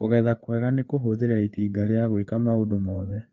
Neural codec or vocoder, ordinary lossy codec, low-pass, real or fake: codec, 16 kHz, 4 kbps, FreqCodec, larger model; Opus, 24 kbps; 7.2 kHz; fake